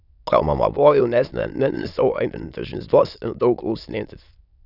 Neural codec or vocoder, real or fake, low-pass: autoencoder, 22.05 kHz, a latent of 192 numbers a frame, VITS, trained on many speakers; fake; 5.4 kHz